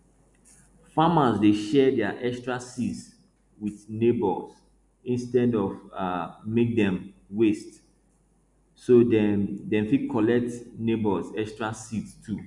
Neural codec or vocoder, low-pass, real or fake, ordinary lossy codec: none; 10.8 kHz; real; none